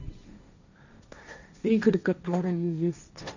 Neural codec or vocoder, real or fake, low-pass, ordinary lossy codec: codec, 16 kHz, 1.1 kbps, Voila-Tokenizer; fake; 7.2 kHz; none